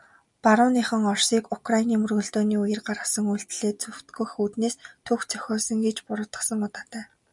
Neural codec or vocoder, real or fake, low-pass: none; real; 10.8 kHz